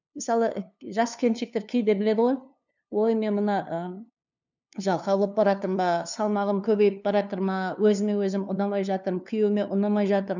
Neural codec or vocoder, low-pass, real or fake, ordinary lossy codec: codec, 16 kHz, 2 kbps, FunCodec, trained on LibriTTS, 25 frames a second; 7.2 kHz; fake; none